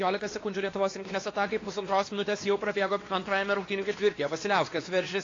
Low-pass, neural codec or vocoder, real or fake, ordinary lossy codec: 7.2 kHz; codec, 16 kHz, 2 kbps, X-Codec, WavLM features, trained on Multilingual LibriSpeech; fake; AAC, 32 kbps